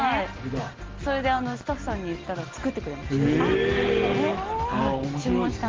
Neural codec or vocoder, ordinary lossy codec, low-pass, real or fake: none; Opus, 16 kbps; 7.2 kHz; real